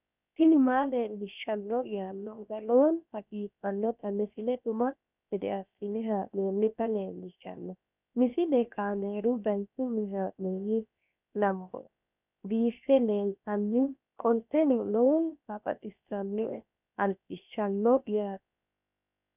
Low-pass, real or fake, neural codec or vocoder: 3.6 kHz; fake; codec, 16 kHz, 0.7 kbps, FocalCodec